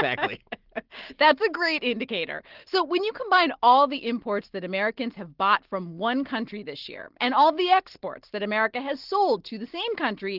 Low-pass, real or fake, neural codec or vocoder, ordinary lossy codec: 5.4 kHz; real; none; Opus, 16 kbps